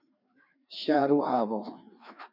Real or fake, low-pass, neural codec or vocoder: fake; 5.4 kHz; codec, 16 kHz, 2 kbps, FreqCodec, larger model